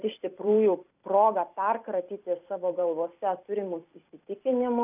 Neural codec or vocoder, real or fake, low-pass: vocoder, 44.1 kHz, 128 mel bands every 256 samples, BigVGAN v2; fake; 3.6 kHz